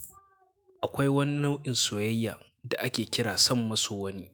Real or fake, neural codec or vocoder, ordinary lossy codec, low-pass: fake; autoencoder, 48 kHz, 128 numbers a frame, DAC-VAE, trained on Japanese speech; none; none